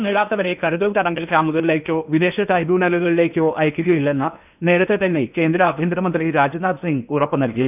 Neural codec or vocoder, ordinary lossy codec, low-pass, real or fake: codec, 16 kHz in and 24 kHz out, 0.8 kbps, FocalCodec, streaming, 65536 codes; none; 3.6 kHz; fake